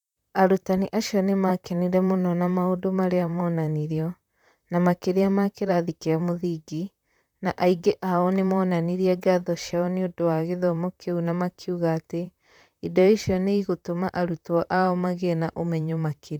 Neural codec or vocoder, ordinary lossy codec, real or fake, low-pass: vocoder, 44.1 kHz, 128 mel bands, Pupu-Vocoder; none; fake; 19.8 kHz